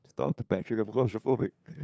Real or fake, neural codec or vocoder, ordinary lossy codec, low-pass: fake; codec, 16 kHz, 2 kbps, FunCodec, trained on LibriTTS, 25 frames a second; none; none